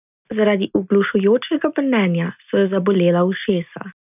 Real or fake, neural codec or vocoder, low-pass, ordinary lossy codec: real; none; 3.6 kHz; none